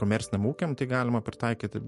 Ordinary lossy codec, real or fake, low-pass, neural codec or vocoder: MP3, 48 kbps; real; 14.4 kHz; none